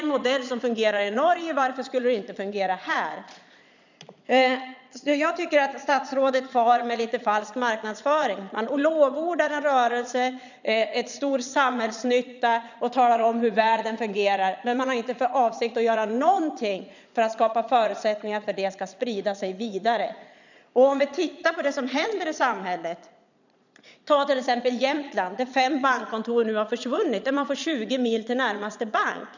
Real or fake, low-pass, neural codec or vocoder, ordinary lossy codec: fake; 7.2 kHz; vocoder, 22.05 kHz, 80 mel bands, Vocos; none